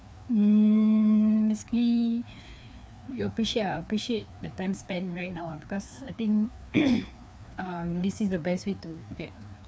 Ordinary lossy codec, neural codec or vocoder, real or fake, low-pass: none; codec, 16 kHz, 2 kbps, FreqCodec, larger model; fake; none